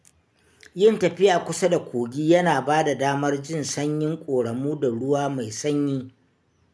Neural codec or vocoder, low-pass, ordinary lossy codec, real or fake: none; none; none; real